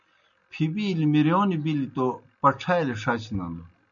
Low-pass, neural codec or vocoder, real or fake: 7.2 kHz; none; real